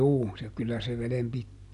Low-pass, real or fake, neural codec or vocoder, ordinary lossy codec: 10.8 kHz; real; none; none